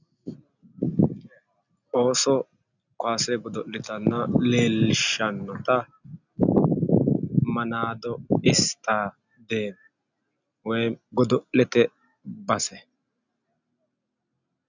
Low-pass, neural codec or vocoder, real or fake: 7.2 kHz; none; real